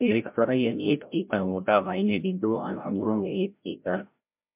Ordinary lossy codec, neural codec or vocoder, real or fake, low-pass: MP3, 32 kbps; codec, 16 kHz, 0.5 kbps, FreqCodec, larger model; fake; 3.6 kHz